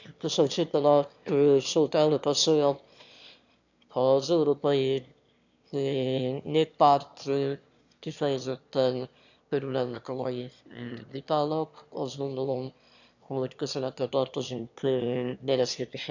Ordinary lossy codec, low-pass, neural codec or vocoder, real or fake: none; 7.2 kHz; autoencoder, 22.05 kHz, a latent of 192 numbers a frame, VITS, trained on one speaker; fake